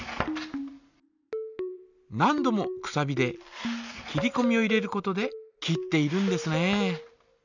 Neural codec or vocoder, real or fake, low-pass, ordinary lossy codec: none; real; 7.2 kHz; none